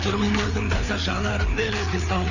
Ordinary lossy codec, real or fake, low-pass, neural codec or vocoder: none; fake; 7.2 kHz; codec, 16 kHz, 4 kbps, FreqCodec, larger model